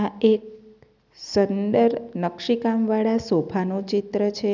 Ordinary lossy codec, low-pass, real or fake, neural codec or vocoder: none; 7.2 kHz; real; none